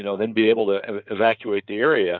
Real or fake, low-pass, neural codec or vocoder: fake; 7.2 kHz; codec, 16 kHz in and 24 kHz out, 2.2 kbps, FireRedTTS-2 codec